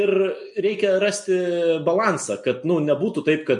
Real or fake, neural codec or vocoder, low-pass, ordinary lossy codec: real; none; 10.8 kHz; MP3, 48 kbps